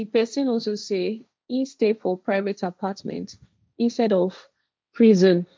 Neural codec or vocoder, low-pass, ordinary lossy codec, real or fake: codec, 16 kHz, 1.1 kbps, Voila-Tokenizer; none; none; fake